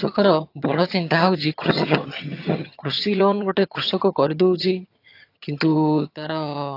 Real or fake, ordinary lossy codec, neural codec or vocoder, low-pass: fake; AAC, 32 kbps; vocoder, 22.05 kHz, 80 mel bands, HiFi-GAN; 5.4 kHz